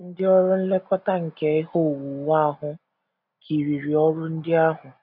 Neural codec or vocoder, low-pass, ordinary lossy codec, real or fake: none; 5.4 kHz; MP3, 48 kbps; real